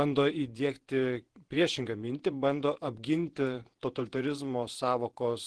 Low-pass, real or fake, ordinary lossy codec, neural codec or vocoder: 10.8 kHz; fake; Opus, 16 kbps; vocoder, 48 kHz, 128 mel bands, Vocos